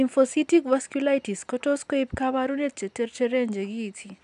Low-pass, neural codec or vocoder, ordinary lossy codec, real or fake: 10.8 kHz; none; none; real